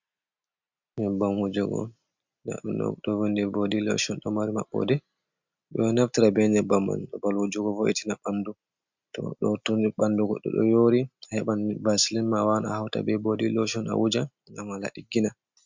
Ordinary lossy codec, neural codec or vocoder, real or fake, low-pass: MP3, 64 kbps; none; real; 7.2 kHz